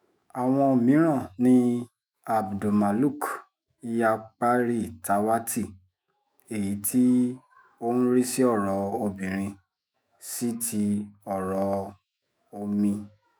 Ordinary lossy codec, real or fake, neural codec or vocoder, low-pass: none; fake; autoencoder, 48 kHz, 128 numbers a frame, DAC-VAE, trained on Japanese speech; none